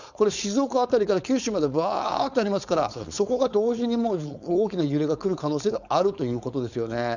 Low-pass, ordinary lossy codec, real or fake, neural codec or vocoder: 7.2 kHz; none; fake; codec, 16 kHz, 4.8 kbps, FACodec